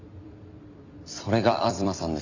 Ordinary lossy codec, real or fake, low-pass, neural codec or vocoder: none; fake; 7.2 kHz; vocoder, 44.1 kHz, 128 mel bands every 256 samples, BigVGAN v2